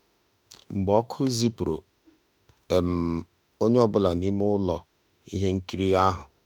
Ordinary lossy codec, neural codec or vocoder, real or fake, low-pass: none; autoencoder, 48 kHz, 32 numbers a frame, DAC-VAE, trained on Japanese speech; fake; 19.8 kHz